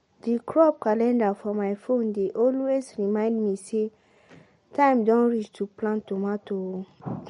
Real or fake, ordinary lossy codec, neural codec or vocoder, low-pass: real; MP3, 48 kbps; none; 10.8 kHz